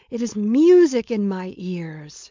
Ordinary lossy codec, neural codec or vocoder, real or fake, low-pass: MP3, 64 kbps; codec, 16 kHz, 4.8 kbps, FACodec; fake; 7.2 kHz